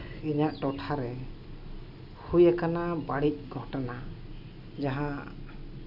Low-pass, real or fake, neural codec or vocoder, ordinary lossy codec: 5.4 kHz; real; none; none